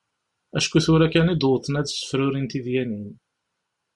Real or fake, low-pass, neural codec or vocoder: real; 10.8 kHz; none